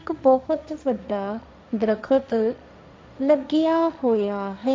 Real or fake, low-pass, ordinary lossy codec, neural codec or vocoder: fake; none; none; codec, 16 kHz, 1.1 kbps, Voila-Tokenizer